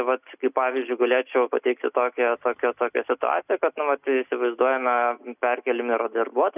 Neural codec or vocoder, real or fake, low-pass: none; real; 3.6 kHz